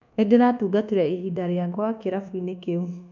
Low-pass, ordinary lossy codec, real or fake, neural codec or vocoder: 7.2 kHz; MP3, 64 kbps; fake; codec, 24 kHz, 1.2 kbps, DualCodec